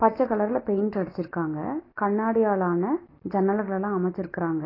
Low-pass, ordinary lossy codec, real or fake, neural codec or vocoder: 5.4 kHz; AAC, 24 kbps; real; none